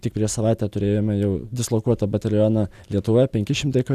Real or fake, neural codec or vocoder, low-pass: real; none; 14.4 kHz